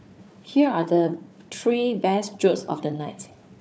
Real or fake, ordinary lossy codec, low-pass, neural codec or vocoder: fake; none; none; codec, 16 kHz, 4 kbps, FunCodec, trained on Chinese and English, 50 frames a second